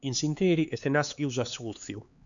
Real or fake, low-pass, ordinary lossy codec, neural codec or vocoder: fake; 7.2 kHz; MP3, 96 kbps; codec, 16 kHz, 4 kbps, X-Codec, HuBERT features, trained on LibriSpeech